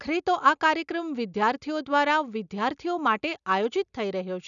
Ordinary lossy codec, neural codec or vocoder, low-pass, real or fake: none; none; 7.2 kHz; real